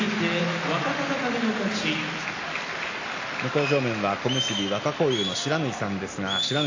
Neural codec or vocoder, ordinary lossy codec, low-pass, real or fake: none; AAC, 48 kbps; 7.2 kHz; real